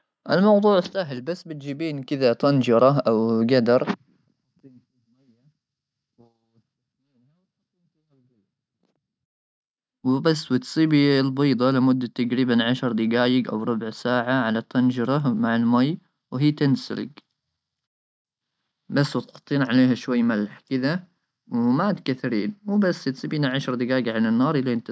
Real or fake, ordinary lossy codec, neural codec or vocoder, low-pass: real; none; none; none